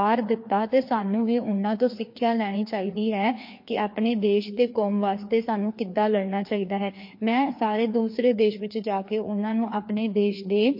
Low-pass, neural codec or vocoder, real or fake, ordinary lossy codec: 5.4 kHz; codec, 16 kHz, 2 kbps, FreqCodec, larger model; fake; MP3, 32 kbps